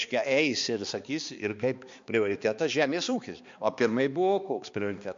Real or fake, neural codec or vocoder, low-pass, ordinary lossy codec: fake; codec, 16 kHz, 2 kbps, X-Codec, HuBERT features, trained on balanced general audio; 7.2 kHz; MP3, 48 kbps